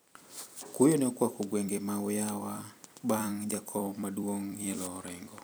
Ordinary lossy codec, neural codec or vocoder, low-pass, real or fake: none; vocoder, 44.1 kHz, 128 mel bands every 256 samples, BigVGAN v2; none; fake